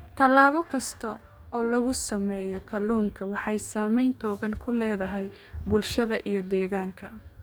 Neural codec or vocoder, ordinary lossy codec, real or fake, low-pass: codec, 44.1 kHz, 2.6 kbps, DAC; none; fake; none